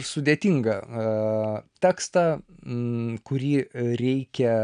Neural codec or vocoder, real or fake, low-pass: none; real; 9.9 kHz